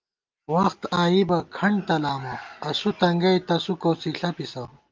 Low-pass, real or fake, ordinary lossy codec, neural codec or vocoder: 7.2 kHz; real; Opus, 24 kbps; none